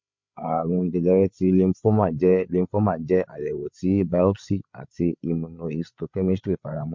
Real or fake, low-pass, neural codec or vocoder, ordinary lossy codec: fake; 7.2 kHz; codec, 16 kHz, 8 kbps, FreqCodec, larger model; MP3, 48 kbps